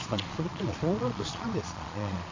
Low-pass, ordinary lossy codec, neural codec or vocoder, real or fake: 7.2 kHz; AAC, 32 kbps; vocoder, 22.05 kHz, 80 mel bands, Vocos; fake